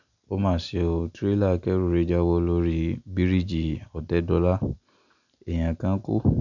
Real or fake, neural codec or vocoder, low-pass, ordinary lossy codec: real; none; 7.2 kHz; none